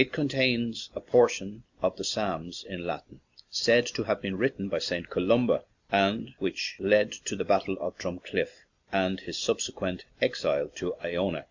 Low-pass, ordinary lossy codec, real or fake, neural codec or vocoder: 7.2 kHz; Opus, 64 kbps; real; none